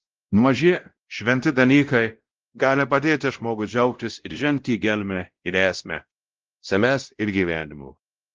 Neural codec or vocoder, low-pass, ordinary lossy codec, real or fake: codec, 16 kHz, 0.5 kbps, X-Codec, WavLM features, trained on Multilingual LibriSpeech; 7.2 kHz; Opus, 16 kbps; fake